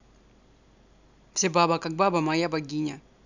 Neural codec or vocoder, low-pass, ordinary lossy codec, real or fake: none; 7.2 kHz; none; real